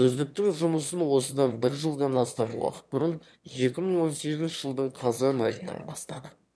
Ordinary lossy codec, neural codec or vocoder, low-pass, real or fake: none; autoencoder, 22.05 kHz, a latent of 192 numbers a frame, VITS, trained on one speaker; none; fake